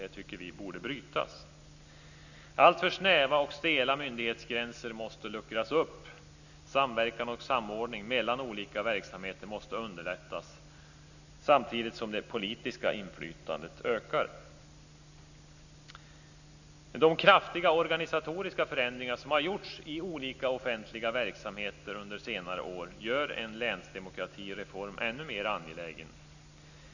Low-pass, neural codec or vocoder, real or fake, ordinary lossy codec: 7.2 kHz; none; real; none